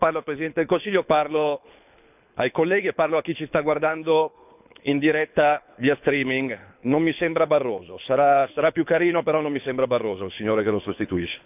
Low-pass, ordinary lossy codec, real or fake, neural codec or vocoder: 3.6 kHz; none; fake; codec, 24 kHz, 6 kbps, HILCodec